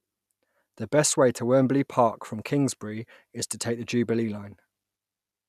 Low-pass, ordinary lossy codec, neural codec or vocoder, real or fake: 14.4 kHz; none; none; real